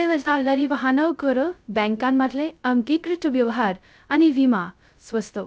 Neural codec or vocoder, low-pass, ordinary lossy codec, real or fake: codec, 16 kHz, 0.2 kbps, FocalCodec; none; none; fake